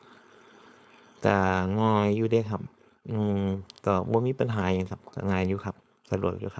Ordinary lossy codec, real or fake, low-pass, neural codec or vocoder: none; fake; none; codec, 16 kHz, 4.8 kbps, FACodec